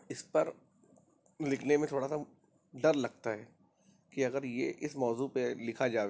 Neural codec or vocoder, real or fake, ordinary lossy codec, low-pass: none; real; none; none